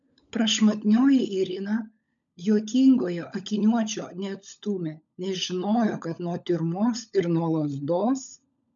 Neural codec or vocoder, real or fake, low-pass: codec, 16 kHz, 16 kbps, FunCodec, trained on LibriTTS, 50 frames a second; fake; 7.2 kHz